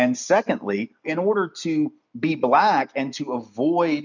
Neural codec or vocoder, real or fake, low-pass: none; real; 7.2 kHz